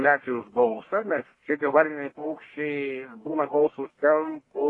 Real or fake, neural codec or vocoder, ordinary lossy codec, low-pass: fake; codec, 44.1 kHz, 1.7 kbps, Pupu-Codec; AAC, 32 kbps; 10.8 kHz